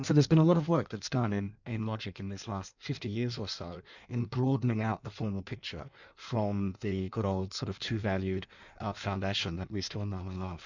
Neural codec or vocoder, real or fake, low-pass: codec, 16 kHz in and 24 kHz out, 1.1 kbps, FireRedTTS-2 codec; fake; 7.2 kHz